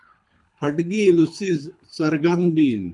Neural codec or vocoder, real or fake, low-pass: codec, 24 kHz, 3 kbps, HILCodec; fake; 10.8 kHz